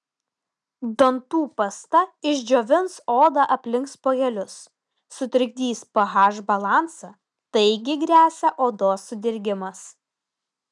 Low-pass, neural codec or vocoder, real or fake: 10.8 kHz; none; real